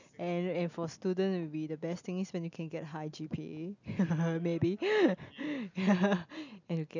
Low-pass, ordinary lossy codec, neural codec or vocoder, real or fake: 7.2 kHz; none; none; real